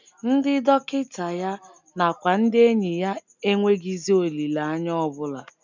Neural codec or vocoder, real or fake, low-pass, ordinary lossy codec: none; real; 7.2 kHz; none